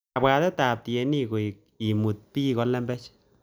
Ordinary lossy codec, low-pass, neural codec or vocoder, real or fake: none; none; none; real